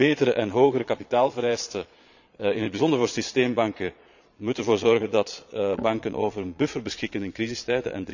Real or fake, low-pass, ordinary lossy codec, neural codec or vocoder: fake; 7.2 kHz; none; vocoder, 22.05 kHz, 80 mel bands, Vocos